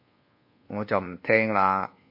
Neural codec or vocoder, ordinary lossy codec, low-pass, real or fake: codec, 24 kHz, 1.2 kbps, DualCodec; MP3, 32 kbps; 5.4 kHz; fake